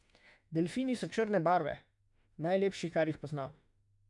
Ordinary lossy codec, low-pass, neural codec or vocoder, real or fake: none; 10.8 kHz; autoencoder, 48 kHz, 32 numbers a frame, DAC-VAE, trained on Japanese speech; fake